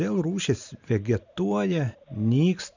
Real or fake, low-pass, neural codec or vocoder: real; 7.2 kHz; none